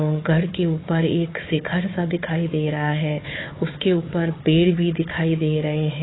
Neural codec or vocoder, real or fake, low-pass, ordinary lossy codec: codec, 16 kHz, 4 kbps, FunCodec, trained on Chinese and English, 50 frames a second; fake; 7.2 kHz; AAC, 16 kbps